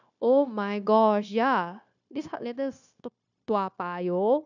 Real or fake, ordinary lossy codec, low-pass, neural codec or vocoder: fake; none; 7.2 kHz; codec, 16 kHz, 0.9 kbps, LongCat-Audio-Codec